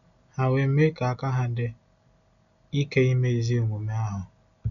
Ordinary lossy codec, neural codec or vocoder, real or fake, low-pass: none; none; real; 7.2 kHz